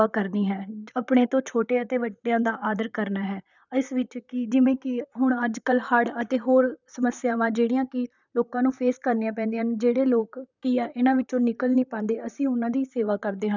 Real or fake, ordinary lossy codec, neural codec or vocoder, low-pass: fake; none; vocoder, 44.1 kHz, 128 mel bands, Pupu-Vocoder; 7.2 kHz